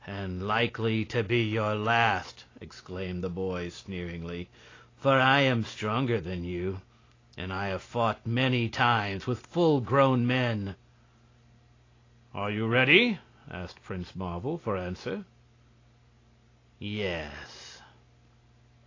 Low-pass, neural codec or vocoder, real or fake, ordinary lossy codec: 7.2 kHz; none; real; AAC, 32 kbps